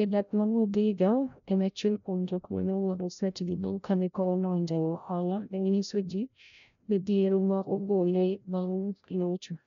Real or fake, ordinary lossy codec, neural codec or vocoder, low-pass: fake; none; codec, 16 kHz, 0.5 kbps, FreqCodec, larger model; 7.2 kHz